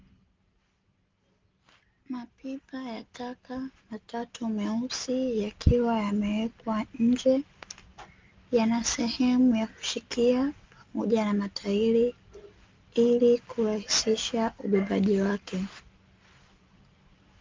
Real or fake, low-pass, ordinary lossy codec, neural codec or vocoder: real; 7.2 kHz; Opus, 24 kbps; none